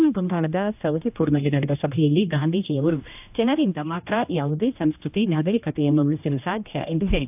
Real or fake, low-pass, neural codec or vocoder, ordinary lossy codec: fake; 3.6 kHz; codec, 16 kHz, 1 kbps, X-Codec, HuBERT features, trained on general audio; AAC, 32 kbps